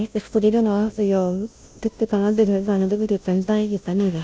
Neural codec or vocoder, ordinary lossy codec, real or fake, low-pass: codec, 16 kHz, 0.5 kbps, FunCodec, trained on Chinese and English, 25 frames a second; none; fake; none